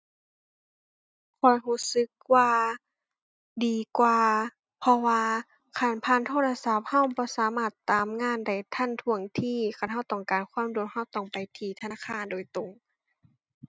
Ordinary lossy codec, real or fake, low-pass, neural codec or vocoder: none; real; none; none